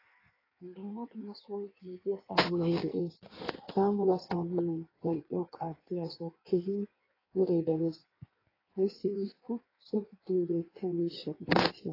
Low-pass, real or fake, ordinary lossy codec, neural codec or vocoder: 5.4 kHz; fake; AAC, 24 kbps; codec, 16 kHz in and 24 kHz out, 1.1 kbps, FireRedTTS-2 codec